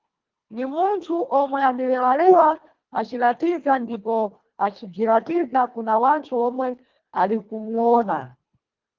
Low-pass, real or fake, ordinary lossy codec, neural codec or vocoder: 7.2 kHz; fake; Opus, 32 kbps; codec, 24 kHz, 1.5 kbps, HILCodec